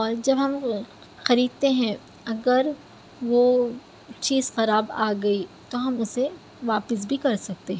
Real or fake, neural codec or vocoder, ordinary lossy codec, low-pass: real; none; none; none